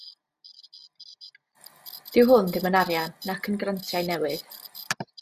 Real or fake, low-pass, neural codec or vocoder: real; 14.4 kHz; none